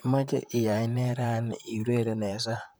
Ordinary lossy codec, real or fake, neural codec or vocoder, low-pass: none; fake; vocoder, 44.1 kHz, 128 mel bands, Pupu-Vocoder; none